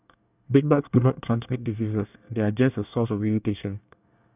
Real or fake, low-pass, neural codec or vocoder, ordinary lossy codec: fake; 3.6 kHz; codec, 24 kHz, 1 kbps, SNAC; none